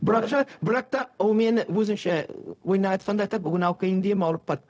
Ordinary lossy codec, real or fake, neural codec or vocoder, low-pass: none; fake; codec, 16 kHz, 0.4 kbps, LongCat-Audio-Codec; none